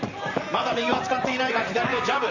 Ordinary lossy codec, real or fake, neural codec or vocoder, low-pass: none; fake; vocoder, 44.1 kHz, 128 mel bands, Pupu-Vocoder; 7.2 kHz